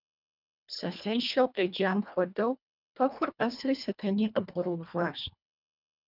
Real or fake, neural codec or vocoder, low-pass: fake; codec, 24 kHz, 1.5 kbps, HILCodec; 5.4 kHz